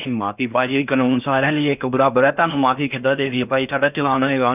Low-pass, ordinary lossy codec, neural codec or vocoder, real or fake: 3.6 kHz; none; codec, 16 kHz in and 24 kHz out, 0.6 kbps, FocalCodec, streaming, 4096 codes; fake